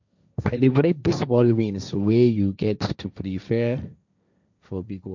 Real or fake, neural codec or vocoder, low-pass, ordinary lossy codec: fake; codec, 16 kHz, 1.1 kbps, Voila-Tokenizer; 7.2 kHz; none